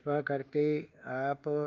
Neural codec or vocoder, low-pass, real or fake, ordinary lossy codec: codec, 24 kHz, 1.2 kbps, DualCodec; 7.2 kHz; fake; Opus, 24 kbps